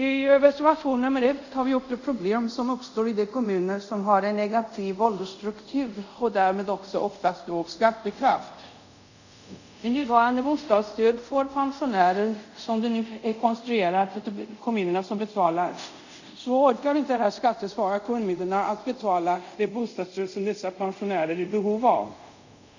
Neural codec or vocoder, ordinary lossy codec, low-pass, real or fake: codec, 24 kHz, 0.5 kbps, DualCodec; none; 7.2 kHz; fake